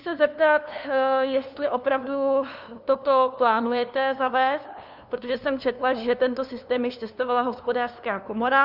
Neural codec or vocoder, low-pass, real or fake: codec, 16 kHz, 2 kbps, FunCodec, trained on LibriTTS, 25 frames a second; 5.4 kHz; fake